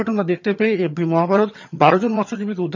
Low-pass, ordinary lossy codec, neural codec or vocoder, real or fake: 7.2 kHz; none; vocoder, 22.05 kHz, 80 mel bands, HiFi-GAN; fake